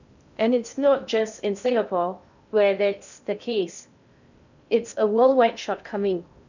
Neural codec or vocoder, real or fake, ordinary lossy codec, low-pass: codec, 16 kHz in and 24 kHz out, 0.6 kbps, FocalCodec, streaming, 2048 codes; fake; none; 7.2 kHz